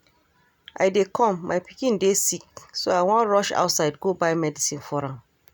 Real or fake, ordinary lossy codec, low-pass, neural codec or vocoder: real; none; none; none